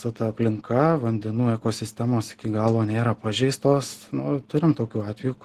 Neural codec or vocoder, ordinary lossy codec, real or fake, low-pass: none; Opus, 16 kbps; real; 14.4 kHz